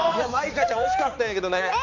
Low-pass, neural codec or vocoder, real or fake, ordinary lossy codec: 7.2 kHz; codec, 16 kHz, 4 kbps, X-Codec, HuBERT features, trained on balanced general audio; fake; none